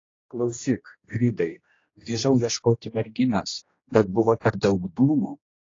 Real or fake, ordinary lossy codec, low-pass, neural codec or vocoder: fake; AAC, 32 kbps; 7.2 kHz; codec, 16 kHz, 1 kbps, X-Codec, HuBERT features, trained on general audio